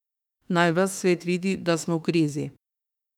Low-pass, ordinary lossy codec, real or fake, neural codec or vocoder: 19.8 kHz; none; fake; autoencoder, 48 kHz, 32 numbers a frame, DAC-VAE, trained on Japanese speech